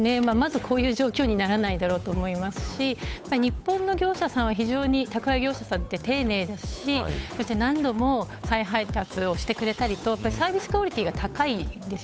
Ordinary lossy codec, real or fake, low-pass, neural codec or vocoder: none; fake; none; codec, 16 kHz, 8 kbps, FunCodec, trained on Chinese and English, 25 frames a second